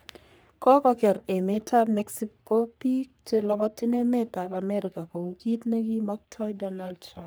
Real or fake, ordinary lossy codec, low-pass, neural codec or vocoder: fake; none; none; codec, 44.1 kHz, 3.4 kbps, Pupu-Codec